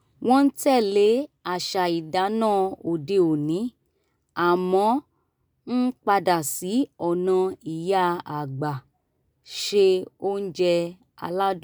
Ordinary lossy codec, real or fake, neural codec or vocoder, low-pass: none; real; none; none